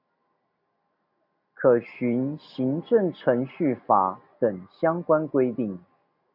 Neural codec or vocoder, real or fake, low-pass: none; real; 5.4 kHz